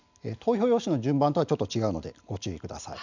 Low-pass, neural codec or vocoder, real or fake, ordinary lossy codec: 7.2 kHz; none; real; none